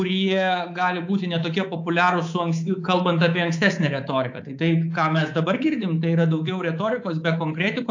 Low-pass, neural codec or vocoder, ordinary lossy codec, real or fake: 7.2 kHz; codec, 24 kHz, 3.1 kbps, DualCodec; AAC, 48 kbps; fake